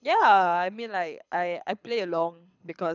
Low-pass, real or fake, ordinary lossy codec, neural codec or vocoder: 7.2 kHz; fake; none; codec, 24 kHz, 6 kbps, HILCodec